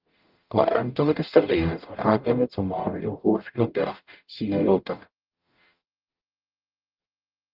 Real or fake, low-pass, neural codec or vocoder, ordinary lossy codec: fake; 5.4 kHz; codec, 44.1 kHz, 0.9 kbps, DAC; Opus, 32 kbps